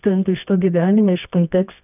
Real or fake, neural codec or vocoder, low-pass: fake; codec, 24 kHz, 0.9 kbps, WavTokenizer, medium music audio release; 3.6 kHz